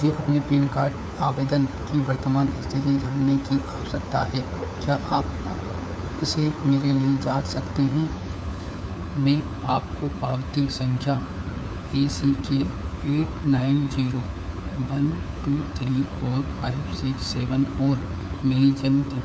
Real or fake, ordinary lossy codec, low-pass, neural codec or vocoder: fake; none; none; codec, 16 kHz, 4 kbps, FunCodec, trained on LibriTTS, 50 frames a second